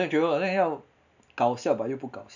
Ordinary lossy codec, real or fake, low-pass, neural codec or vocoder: none; real; 7.2 kHz; none